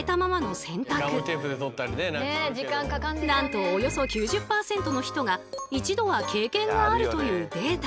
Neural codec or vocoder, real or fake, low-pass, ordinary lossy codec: none; real; none; none